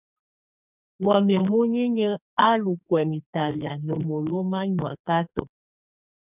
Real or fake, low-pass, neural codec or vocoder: fake; 3.6 kHz; codec, 44.1 kHz, 2.6 kbps, SNAC